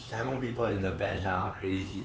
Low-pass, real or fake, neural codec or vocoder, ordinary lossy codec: none; fake; codec, 16 kHz, 4 kbps, X-Codec, HuBERT features, trained on LibriSpeech; none